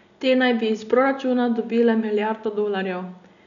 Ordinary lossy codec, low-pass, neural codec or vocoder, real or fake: none; 7.2 kHz; none; real